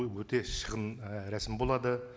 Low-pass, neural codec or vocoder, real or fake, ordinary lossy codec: none; none; real; none